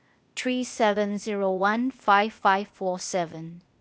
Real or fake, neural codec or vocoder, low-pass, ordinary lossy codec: fake; codec, 16 kHz, 0.8 kbps, ZipCodec; none; none